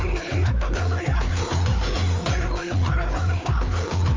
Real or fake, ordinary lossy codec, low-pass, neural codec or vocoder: fake; Opus, 32 kbps; 7.2 kHz; codec, 16 kHz, 4 kbps, FreqCodec, larger model